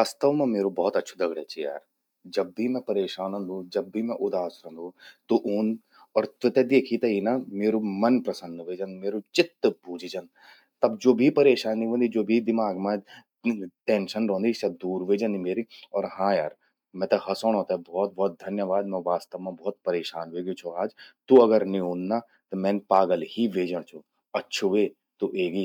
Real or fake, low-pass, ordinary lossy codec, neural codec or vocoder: real; 19.8 kHz; none; none